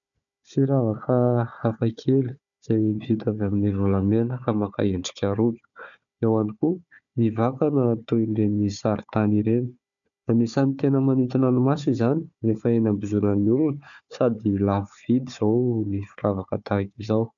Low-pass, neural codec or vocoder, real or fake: 7.2 kHz; codec, 16 kHz, 4 kbps, FunCodec, trained on Chinese and English, 50 frames a second; fake